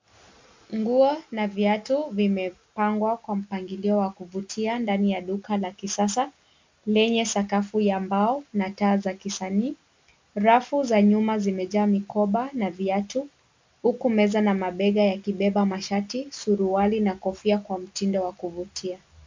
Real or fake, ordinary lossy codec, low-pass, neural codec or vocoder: real; MP3, 64 kbps; 7.2 kHz; none